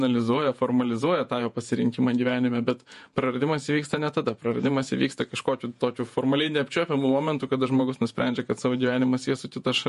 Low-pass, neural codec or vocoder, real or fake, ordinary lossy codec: 14.4 kHz; vocoder, 48 kHz, 128 mel bands, Vocos; fake; MP3, 48 kbps